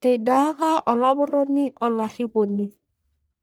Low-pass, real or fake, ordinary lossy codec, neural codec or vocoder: none; fake; none; codec, 44.1 kHz, 1.7 kbps, Pupu-Codec